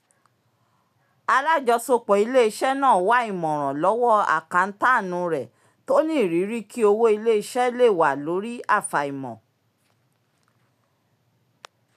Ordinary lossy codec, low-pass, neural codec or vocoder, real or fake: none; 14.4 kHz; none; real